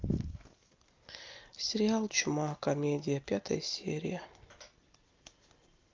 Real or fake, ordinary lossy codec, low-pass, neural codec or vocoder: real; Opus, 24 kbps; 7.2 kHz; none